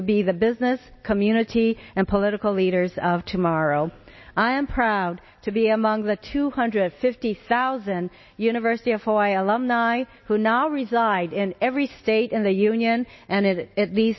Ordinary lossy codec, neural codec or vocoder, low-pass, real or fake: MP3, 24 kbps; none; 7.2 kHz; real